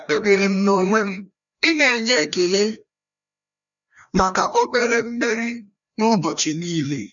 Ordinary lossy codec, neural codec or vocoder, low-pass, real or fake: MP3, 96 kbps; codec, 16 kHz, 1 kbps, FreqCodec, larger model; 7.2 kHz; fake